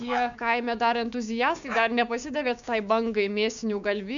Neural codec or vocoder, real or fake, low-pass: codec, 16 kHz, 6 kbps, DAC; fake; 7.2 kHz